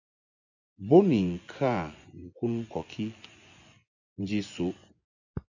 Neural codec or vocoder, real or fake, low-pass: vocoder, 24 kHz, 100 mel bands, Vocos; fake; 7.2 kHz